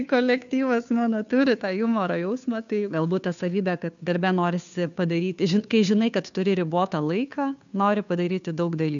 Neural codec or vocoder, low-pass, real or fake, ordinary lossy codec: codec, 16 kHz, 2 kbps, FunCodec, trained on Chinese and English, 25 frames a second; 7.2 kHz; fake; MP3, 96 kbps